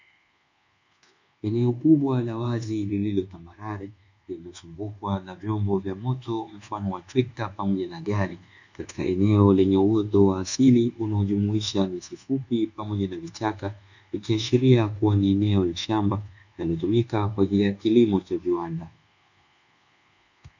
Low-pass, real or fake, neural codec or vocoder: 7.2 kHz; fake; codec, 24 kHz, 1.2 kbps, DualCodec